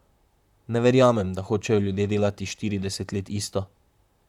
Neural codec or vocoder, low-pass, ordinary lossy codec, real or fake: vocoder, 44.1 kHz, 128 mel bands, Pupu-Vocoder; 19.8 kHz; none; fake